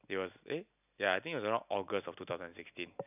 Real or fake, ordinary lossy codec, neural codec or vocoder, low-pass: real; none; none; 3.6 kHz